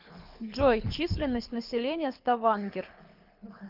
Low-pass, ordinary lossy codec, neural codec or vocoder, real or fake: 5.4 kHz; Opus, 24 kbps; codec, 24 kHz, 6 kbps, HILCodec; fake